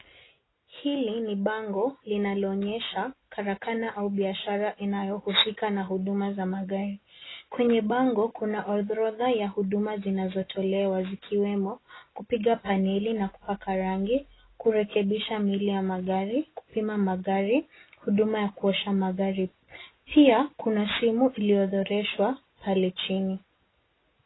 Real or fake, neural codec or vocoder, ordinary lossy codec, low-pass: real; none; AAC, 16 kbps; 7.2 kHz